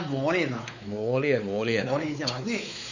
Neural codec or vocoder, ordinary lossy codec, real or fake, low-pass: codec, 16 kHz, 4 kbps, X-Codec, WavLM features, trained on Multilingual LibriSpeech; none; fake; 7.2 kHz